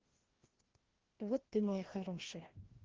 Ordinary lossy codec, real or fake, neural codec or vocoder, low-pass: Opus, 16 kbps; fake; codec, 16 kHz, 1 kbps, FreqCodec, larger model; 7.2 kHz